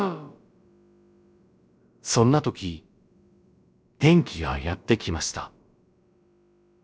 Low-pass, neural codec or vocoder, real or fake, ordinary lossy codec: none; codec, 16 kHz, about 1 kbps, DyCAST, with the encoder's durations; fake; none